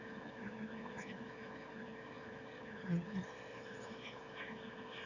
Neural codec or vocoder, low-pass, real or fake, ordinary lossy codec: autoencoder, 22.05 kHz, a latent of 192 numbers a frame, VITS, trained on one speaker; 7.2 kHz; fake; none